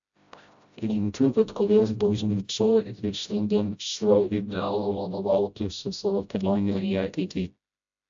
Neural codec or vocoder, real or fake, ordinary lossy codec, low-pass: codec, 16 kHz, 0.5 kbps, FreqCodec, smaller model; fake; AAC, 64 kbps; 7.2 kHz